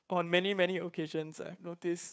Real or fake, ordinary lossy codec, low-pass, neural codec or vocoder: fake; none; none; codec, 16 kHz, 2 kbps, FunCodec, trained on Chinese and English, 25 frames a second